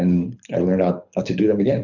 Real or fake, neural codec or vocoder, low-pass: fake; codec, 24 kHz, 6 kbps, HILCodec; 7.2 kHz